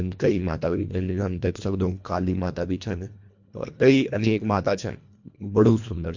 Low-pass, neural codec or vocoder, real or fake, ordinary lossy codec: 7.2 kHz; codec, 24 kHz, 1.5 kbps, HILCodec; fake; MP3, 48 kbps